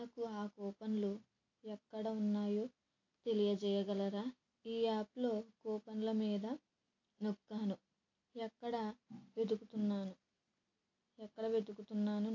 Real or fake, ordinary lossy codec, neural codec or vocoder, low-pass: real; AAC, 48 kbps; none; 7.2 kHz